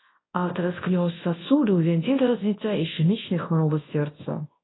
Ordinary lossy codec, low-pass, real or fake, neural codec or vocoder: AAC, 16 kbps; 7.2 kHz; fake; codec, 24 kHz, 0.9 kbps, WavTokenizer, large speech release